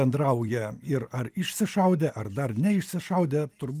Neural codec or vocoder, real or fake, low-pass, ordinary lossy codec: none; real; 14.4 kHz; Opus, 32 kbps